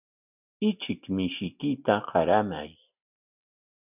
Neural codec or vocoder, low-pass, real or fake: none; 3.6 kHz; real